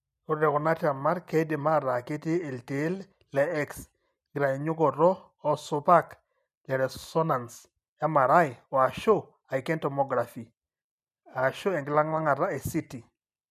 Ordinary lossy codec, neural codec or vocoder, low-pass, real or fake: none; none; 14.4 kHz; real